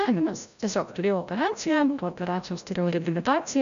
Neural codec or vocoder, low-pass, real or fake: codec, 16 kHz, 0.5 kbps, FreqCodec, larger model; 7.2 kHz; fake